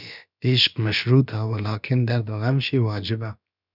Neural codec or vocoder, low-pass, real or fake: codec, 16 kHz, about 1 kbps, DyCAST, with the encoder's durations; 5.4 kHz; fake